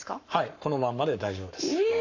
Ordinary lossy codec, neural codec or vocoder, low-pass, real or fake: none; codec, 44.1 kHz, 7.8 kbps, Pupu-Codec; 7.2 kHz; fake